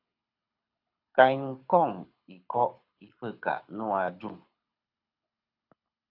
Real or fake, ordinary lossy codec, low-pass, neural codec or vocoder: fake; AAC, 32 kbps; 5.4 kHz; codec, 24 kHz, 6 kbps, HILCodec